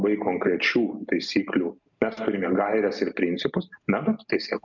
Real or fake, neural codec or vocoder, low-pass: real; none; 7.2 kHz